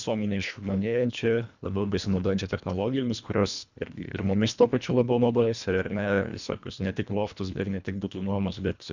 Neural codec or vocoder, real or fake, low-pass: codec, 24 kHz, 1.5 kbps, HILCodec; fake; 7.2 kHz